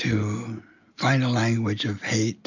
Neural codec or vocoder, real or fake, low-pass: none; real; 7.2 kHz